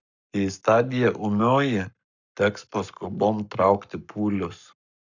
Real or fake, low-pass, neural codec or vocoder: fake; 7.2 kHz; codec, 44.1 kHz, 7.8 kbps, Pupu-Codec